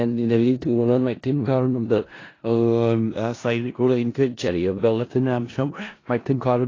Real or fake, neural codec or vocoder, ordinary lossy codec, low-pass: fake; codec, 16 kHz in and 24 kHz out, 0.4 kbps, LongCat-Audio-Codec, four codebook decoder; AAC, 32 kbps; 7.2 kHz